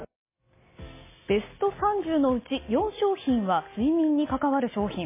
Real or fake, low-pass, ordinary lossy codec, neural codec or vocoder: real; 3.6 kHz; MP3, 16 kbps; none